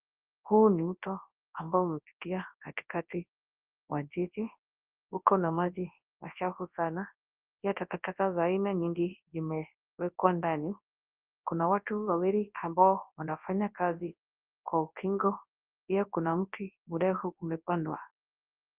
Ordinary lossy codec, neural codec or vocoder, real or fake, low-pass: Opus, 24 kbps; codec, 24 kHz, 0.9 kbps, WavTokenizer, large speech release; fake; 3.6 kHz